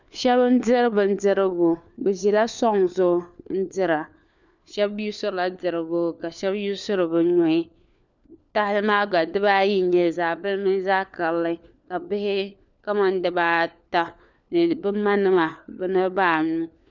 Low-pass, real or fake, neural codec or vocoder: 7.2 kHz; fake; codec, 16 kHz, 4 kbps, FunCodec, trained on LibriTTS, 50 frames a second